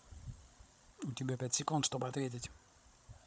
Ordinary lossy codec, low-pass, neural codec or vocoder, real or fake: none; none; codec, 16 kHz, 16 kbps, FreqCodec, larger model; fake